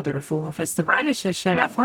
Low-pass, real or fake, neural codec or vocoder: 19.8 kHz; fake; codec, 44.1 kHz, 0.9 kbps, DAC